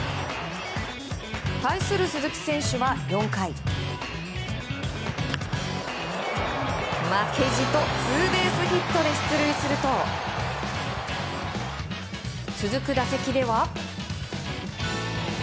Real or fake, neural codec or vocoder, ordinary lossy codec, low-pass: real; none; none; none